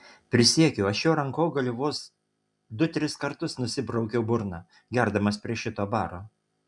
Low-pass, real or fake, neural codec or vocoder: 10.8 kHz; real; none